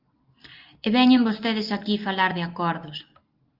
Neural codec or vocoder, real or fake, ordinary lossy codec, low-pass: none; real; Opus, 32 kbps; 5.4 kHz